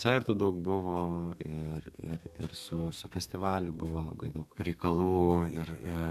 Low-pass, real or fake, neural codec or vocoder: 14.4 kHz; fake; codec, 32 kHz, 1.9 kbps, SNAC